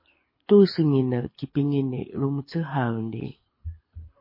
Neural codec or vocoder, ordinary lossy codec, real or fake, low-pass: codec, 24 kHz, 6 kbps, HILCodec; MP3, 24 kbps; fake; 5.4 kHz